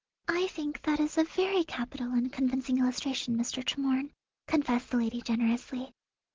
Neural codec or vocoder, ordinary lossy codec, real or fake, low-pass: none; Opus, 16 kbps; real; 7.2 kHz